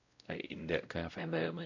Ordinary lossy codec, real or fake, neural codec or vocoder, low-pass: none; fake; codec, 16 kHz, 0.5 kbps, X-Codec, WavLM features, trained on Multilingual LibriSpeech; 7.2 kHz